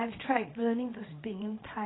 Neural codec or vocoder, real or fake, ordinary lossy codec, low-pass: codec, 24 kHz, 0.9 kbps, WavTokenizer, small release; fake; AAC, 16 kbps; 7.2 kHz